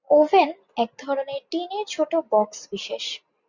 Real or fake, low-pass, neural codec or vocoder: real; 7.2 kHz; none